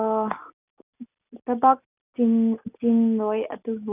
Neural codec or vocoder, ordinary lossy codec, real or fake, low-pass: none; none; real; 3.6 kHz